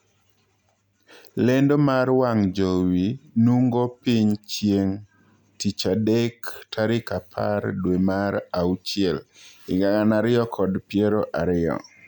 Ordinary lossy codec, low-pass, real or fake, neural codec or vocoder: none; 19.8 kHz; real; none